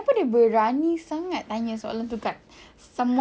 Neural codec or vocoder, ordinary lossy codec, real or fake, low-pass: none; none; real; none